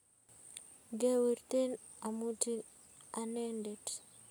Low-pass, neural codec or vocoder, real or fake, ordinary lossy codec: none; none; real; none